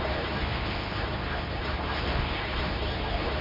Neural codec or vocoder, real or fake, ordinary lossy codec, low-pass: codec, 24 kHz, 0.9 kbps, WavTokenizer, medium speech release version 2; fake; MP3, 48 kbps; 5.4 kHz